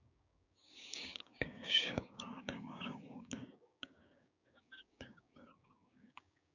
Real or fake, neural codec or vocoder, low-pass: fake; codec, 16 kHz, 6 kbps, DAC; 7.2 kHz